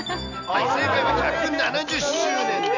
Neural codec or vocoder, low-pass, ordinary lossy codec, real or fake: none; 7.2 kHz; none; real